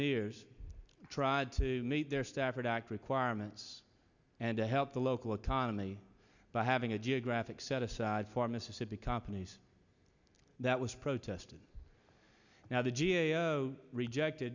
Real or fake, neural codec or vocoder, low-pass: real; none; 7.2 kHz